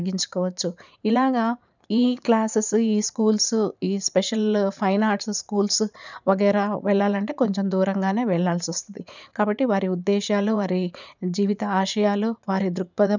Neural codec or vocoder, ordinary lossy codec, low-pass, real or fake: vocoder, 44.1 kHz, 80 mel bands, Vocos; none; 7.2 kHz; fake